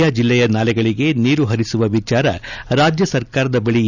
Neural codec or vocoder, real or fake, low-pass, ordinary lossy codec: none; real; 7.2 kHz; none